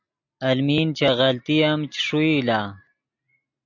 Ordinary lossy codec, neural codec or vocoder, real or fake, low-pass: AAC, 48 kbps; none; real; 7.2 kHz